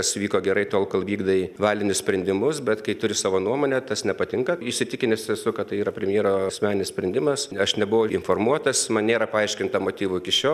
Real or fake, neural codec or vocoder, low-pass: real; none; 14.4 kHz